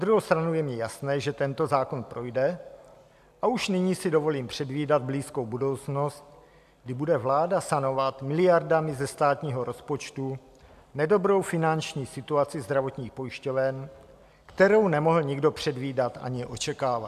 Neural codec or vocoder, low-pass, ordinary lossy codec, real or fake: none; 14.4 kHz; AAC, 96 kbps; real